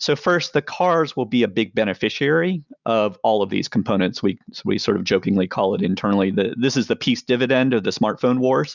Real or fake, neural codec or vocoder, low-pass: real; none; 7.2 kHz